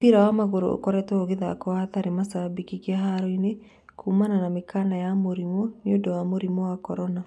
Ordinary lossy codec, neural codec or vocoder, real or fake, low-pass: none; none; real; none